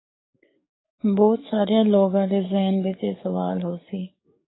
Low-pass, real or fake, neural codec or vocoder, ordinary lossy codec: 7.2 kHz; fake; codec, 44.1 kHz, 7.8 kbps, DAC; AAC, 16 kbps